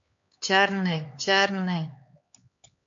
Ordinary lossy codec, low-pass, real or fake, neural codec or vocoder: MP3, 48 kbps; 7.2 kHz; fake; codec, 16 kHz, 4 kbps, X-Codec, HuBERT features, trained on LibriSpeech